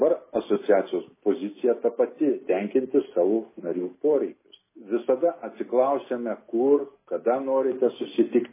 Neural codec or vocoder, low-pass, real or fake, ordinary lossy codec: none; 3.6 kHz; real; MP3, 16 kbps